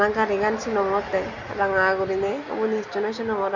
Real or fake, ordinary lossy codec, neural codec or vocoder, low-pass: real; none; none; 7.2 kHz